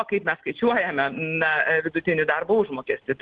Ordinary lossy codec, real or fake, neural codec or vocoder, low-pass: Opus, 16 kbps; real; none; 9.9 kHz